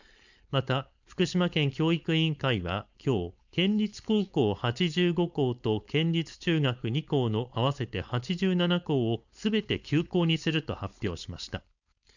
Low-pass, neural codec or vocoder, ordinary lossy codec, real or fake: 7.2 kHz; codec, 16 kHz, 4.8 kbps, FACodec; none; fake